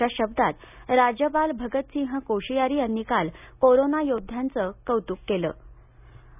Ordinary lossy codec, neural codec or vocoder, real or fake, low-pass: none; none; real; 3.6 kHz